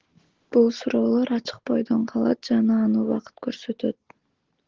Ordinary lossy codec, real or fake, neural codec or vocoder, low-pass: Opus, 16 kbps; real; none; 7.2 kHz